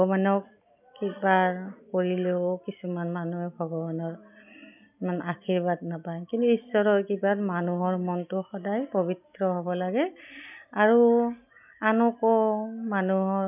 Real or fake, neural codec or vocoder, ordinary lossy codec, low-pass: real; none; none; 3.6 kHz